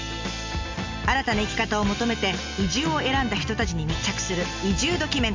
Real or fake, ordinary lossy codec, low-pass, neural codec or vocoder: real; MP3, 64 kbps; 7.2 kHz; none